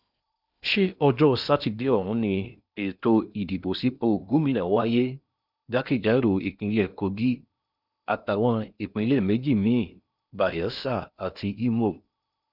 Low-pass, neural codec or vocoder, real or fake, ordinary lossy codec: 5.4 kHz; codec, 16 kHz in and 24 kHz out, 0.8 kbps, FocalCodec, streaming, 65536 codes; fake; none